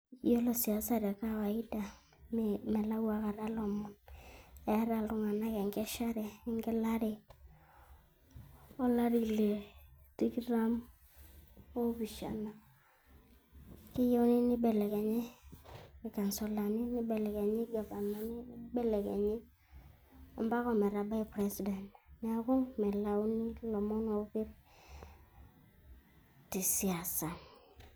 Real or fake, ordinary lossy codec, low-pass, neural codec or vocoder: real; none; none; none